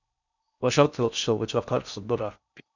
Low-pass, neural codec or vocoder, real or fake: 7.2 kHz; codec, 16 kHz in and 24 kHz out, 0.6 kbps, FocalCodec, streaming, 4096 codes; fake